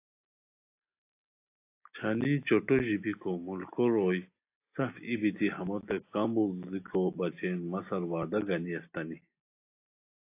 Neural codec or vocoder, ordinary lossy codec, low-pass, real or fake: none; AAC, 24 kbps; 3.6 kHz; real